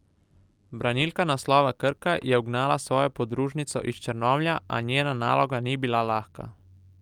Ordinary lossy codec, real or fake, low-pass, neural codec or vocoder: Opus, 32 kbps; real; 19.8 kHz; none